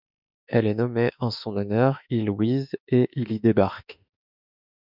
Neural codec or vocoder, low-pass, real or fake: autoencoder, 48 kHz, 32 numbers a frame, DAC-VAE, trained on Japanese speech; 5.4 kHz; fake